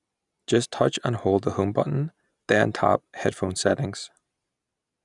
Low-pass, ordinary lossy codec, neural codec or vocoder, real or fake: 10.8 kHz; none; none; real